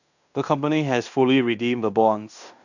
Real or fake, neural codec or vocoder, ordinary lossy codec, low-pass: fake; codec, 16 kHz in and 24 kHz out, 0.9 kbps, LongCat-Audio-Codec, fine tuned four codebook decoder; none; 7.2 kHz